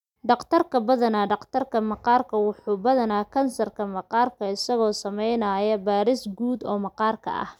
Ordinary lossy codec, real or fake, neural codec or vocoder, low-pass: none; real; none; 19.8 kHz